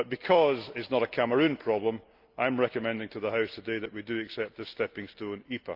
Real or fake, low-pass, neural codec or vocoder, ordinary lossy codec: real; 5.4 kHz; none; Opus, 24 kbps